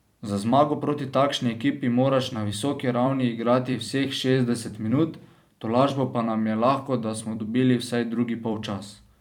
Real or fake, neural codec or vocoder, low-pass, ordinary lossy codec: fake; vocoder, 44.1 kHz, 128 mel bands every 256 samples, BigVGAN v2; 19.8 kHz; none